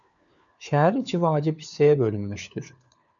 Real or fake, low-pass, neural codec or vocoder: fake; 7.2 kHz; codec, 16 kHz, 4 kbps, FunCodec, trained on LibriTTS, 50 frames a second